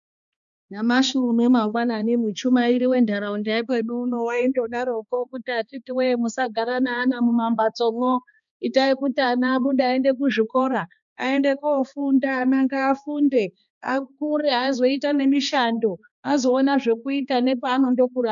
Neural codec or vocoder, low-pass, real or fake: codec, 16 kHz, 2 kbps, X-Codec, HuBERT features, trained on balanced general audio; 7.2 kHz; fake